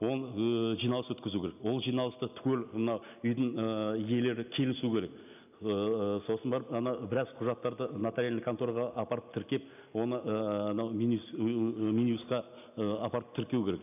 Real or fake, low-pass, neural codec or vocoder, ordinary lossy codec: real; 3.6 kHz; none; none